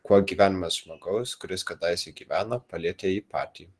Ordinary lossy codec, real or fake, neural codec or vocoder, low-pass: Opus, 16 kbps; real; none; 10.8 kHz